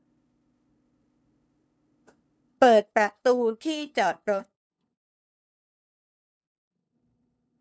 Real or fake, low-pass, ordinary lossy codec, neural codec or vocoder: fake; none; none; codec, 16 kHz, 2 kbps, FunCodec, trained on LibriTTS, 25 frames a second